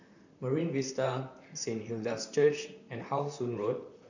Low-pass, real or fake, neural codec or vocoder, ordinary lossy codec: 7.2 kHz; fake; vocoder, 44.1 kHz, 128 mel bands, Pupu-Vocoder; none